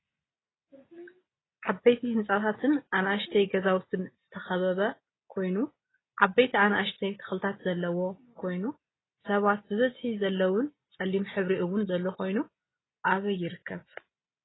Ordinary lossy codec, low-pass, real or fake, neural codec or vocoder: AAC, 16 kbps; 7.2 kHz; real; none